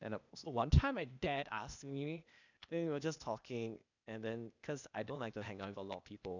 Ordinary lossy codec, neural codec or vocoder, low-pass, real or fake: none; codec, 16 kHz, 0.8 kbps, ZipCodec; 7.2 kHz; fake